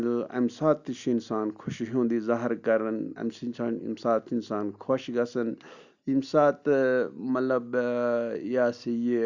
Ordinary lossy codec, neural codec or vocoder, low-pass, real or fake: none; none; 7.2 kHz; real